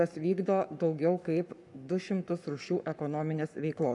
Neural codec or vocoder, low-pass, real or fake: codec, 44.1 kHz, 7.8 kbps, Pupu-Codec; 10.8 kHz; fake